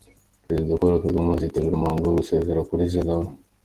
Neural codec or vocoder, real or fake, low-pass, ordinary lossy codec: none; real; 14.4 kHz; Opus, 16 kbps